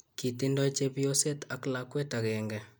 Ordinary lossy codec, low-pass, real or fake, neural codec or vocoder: none; none; real; none